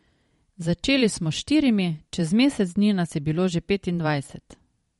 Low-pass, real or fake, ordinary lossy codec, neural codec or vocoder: 19.8 kHz; fake; MP3, 48 kbps; vocoder, 44.1 kHz, 128 mel bands every 512 samples, BigVGAN v2